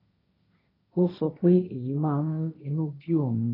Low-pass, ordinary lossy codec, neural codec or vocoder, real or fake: 5.4 kHz; AAC, 24 kbps; codec, 16 kHz, 1.1 kbps, Voila-Tokenizer; fake